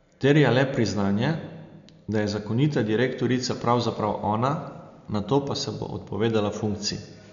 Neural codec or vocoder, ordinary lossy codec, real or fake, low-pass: none; none; real; 7.2 kHz